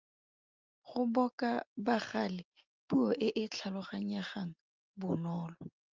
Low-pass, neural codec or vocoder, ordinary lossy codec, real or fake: 7.2 kHz; none; Opus, 32 kbps; real